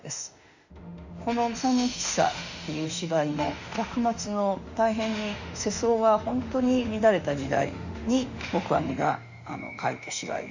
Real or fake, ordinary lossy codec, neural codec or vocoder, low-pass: fake; none; autoencoder, 48 kHz, 32 numbers a frame, DAC-VAE, trained on Japanese speech; 7.2 kHz